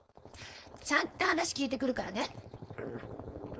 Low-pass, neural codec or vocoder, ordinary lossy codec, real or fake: none; codec, 16 kHz, 4.8 kbps, FACodec; none; fake